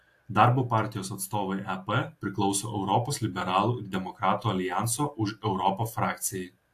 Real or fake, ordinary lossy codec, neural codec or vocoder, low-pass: real; AAC, 64 kbps; none; 14.4 kHz